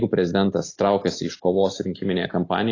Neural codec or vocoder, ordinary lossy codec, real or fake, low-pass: none; AAC, 32 kbps; real; 7.2 kHz